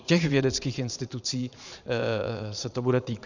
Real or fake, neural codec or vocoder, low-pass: fake; vocoder, 22.05 kHz, 80 mel bands, Vocos; 7.2 kHz